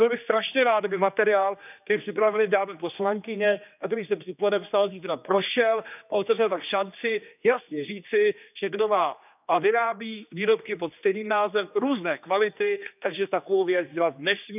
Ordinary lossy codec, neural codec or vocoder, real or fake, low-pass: none; codec, 16 kHz, 2 kbps, X-Codec, HuBERT features, trained on general audio; fake; 3.6 kHz